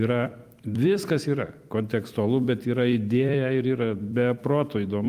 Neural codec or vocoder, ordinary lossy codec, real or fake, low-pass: vocoder, 44.1 kHz, 128 mel bands every 256 samples, BigVGAN v2; Opus, 32 kbps; fake; 14.4 kHz